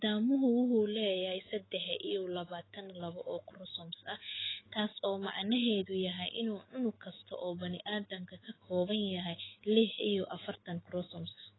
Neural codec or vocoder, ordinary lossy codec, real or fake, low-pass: autoencoder, 48 kHz, 128 numbers a frame, DAC-VAE, trained on Japanese speech; AAC, 16 kbps; fake; 7.2 kHz